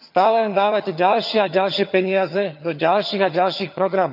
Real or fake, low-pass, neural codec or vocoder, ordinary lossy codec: fake; 5.4 kHz; vocoder, 22.05 kHz, 80 mel bands, HiFi-GAN; none